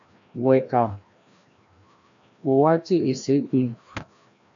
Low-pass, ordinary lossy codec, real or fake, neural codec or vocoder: 7.2 kHz; AAC, 64 kbps; fake; codec, 16 kHz, 1 kbps, FreqCodec, larger model